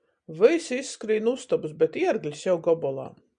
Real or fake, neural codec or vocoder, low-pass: real; none; 9.9 kHz